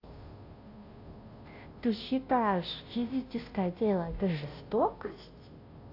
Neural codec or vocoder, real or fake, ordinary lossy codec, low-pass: codec, 16 kHz, 0.5 kbps, FunCodec, trained on Chinese and English, 25 frames a second; fake; AAC, 32 kbps; 5.4 kHz